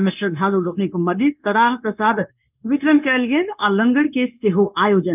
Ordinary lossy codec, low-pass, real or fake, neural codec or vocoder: none; 3.6 kHz; fake; codec, 16 kHz, 0.9 kbps, LongCat-Audio-Codec